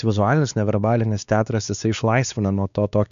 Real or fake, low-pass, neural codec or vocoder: fake; 7.2 kHz; codec, 16 kHz, 2 kbps, X-Codec, WavLM features, trained on Multilingual LibriSpeech